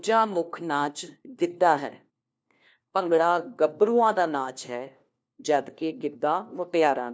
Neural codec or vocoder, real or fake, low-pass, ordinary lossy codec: codec, 16 kHz, 1 kbps, FunCodec, trained on LibriTTS, 50 frames a second; fake; none; none